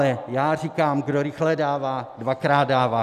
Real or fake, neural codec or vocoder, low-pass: real; none; 14.4 kHz